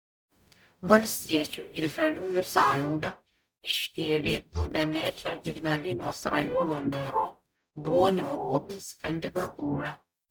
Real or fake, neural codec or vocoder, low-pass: fake; codec, 44.1 kHz, 0.9 kbps, DAC; 19.8 kHz